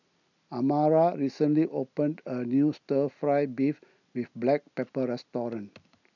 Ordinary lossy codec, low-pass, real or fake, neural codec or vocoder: none; 7.2 kHz; real; none